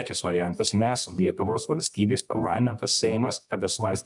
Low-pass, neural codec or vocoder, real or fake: 10.8 kHz; codec, 24 kHz, 0.9 kbps, WavTokenizer, medium music audio release; fake